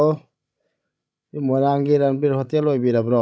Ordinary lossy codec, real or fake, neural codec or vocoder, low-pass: none; fake; codec, 16 kHz, 16 kbps, FreqCodec, larger model; none